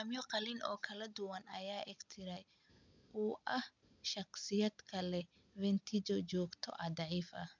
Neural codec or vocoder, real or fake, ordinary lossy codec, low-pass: none; real; MP3, 64 kbps; 7.2 kHz